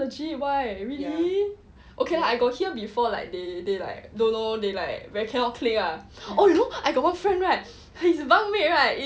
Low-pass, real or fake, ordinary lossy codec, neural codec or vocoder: none; real; none; none